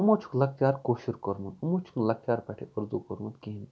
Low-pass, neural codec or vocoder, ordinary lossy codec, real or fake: none; none; none; real